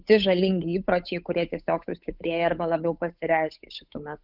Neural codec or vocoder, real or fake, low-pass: codec, 16 kHz, 8 kbps, FunCodec, trained on Chinese and English, 25 frames a second; fake; 5.4 kHz